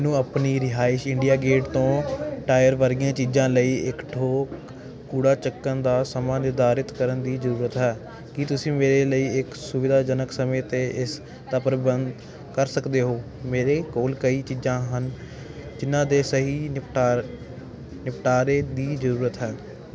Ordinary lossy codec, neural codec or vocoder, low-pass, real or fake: none; none; none; real